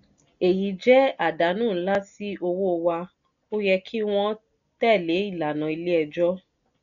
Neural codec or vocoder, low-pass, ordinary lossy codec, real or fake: none; 7.2 kHz; none; real